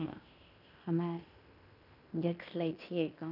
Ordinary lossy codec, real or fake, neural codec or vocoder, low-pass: none; fake; codec, 16 kHz in and 24 kHz out, 0.9 kbps, LongCat-Audio-Codec, fine tuned four codebook decoder; 5.4 kHz